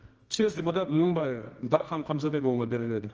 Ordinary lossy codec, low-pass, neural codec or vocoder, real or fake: Opus, 24 kbps; 7.2 kHz; codec, 24 kHz, 0.9 kbps, WavTokenizer, medium music audio release; fake